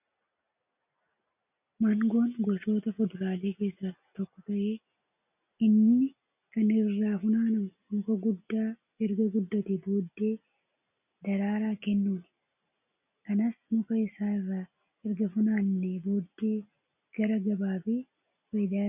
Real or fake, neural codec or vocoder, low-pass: real; none; 3.6 kHz